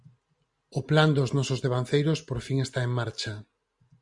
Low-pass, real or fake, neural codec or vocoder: 10.8 kHz; real; none